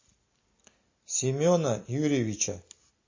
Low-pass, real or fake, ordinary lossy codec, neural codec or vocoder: 7.2 kHz; real; MP3, 32 kbps; none